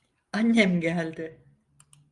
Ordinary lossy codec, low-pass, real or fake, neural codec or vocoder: Opus, 32 kbps; 10.8 kHz; real; none